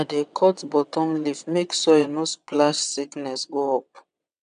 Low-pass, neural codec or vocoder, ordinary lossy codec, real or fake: 9.9 kHz; vocoder, 22.05 kHz, 80 mel bands, WaveNeXt; none; fake